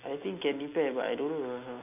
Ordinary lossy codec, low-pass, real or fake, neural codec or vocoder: none; 3.6 kHz; real; none